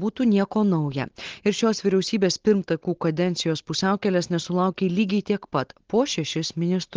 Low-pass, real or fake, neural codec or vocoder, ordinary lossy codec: 7.2 kHz; real; none; Opus, 16 kbps